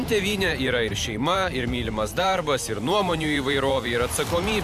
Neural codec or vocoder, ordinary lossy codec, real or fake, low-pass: vocoder, 44.1 kHz, 128 mel bands every 512 samples, BigVGAN v2; MP3, 96 kbps; fake; 14.4 kHz